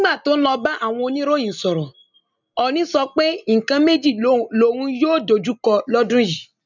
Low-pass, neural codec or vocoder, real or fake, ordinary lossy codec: 7.2 kHz; none; real; none